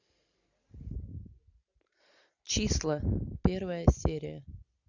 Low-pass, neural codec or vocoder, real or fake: 7.2 kHz; none; real